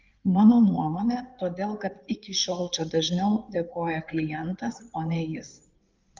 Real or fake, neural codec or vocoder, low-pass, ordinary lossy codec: fake; codec, 16 kHz in and 24 kHz out, 2.2 kbps, FireRedTTS-2 codec; 7.2 kHz; Opus, 32 kbps